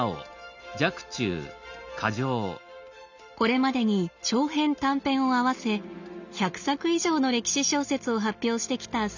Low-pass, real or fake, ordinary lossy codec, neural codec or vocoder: 7.2 kHz; real; none; none